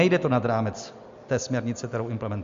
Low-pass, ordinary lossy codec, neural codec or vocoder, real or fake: 7.2 kHz; MP3, 48 kbps; none; real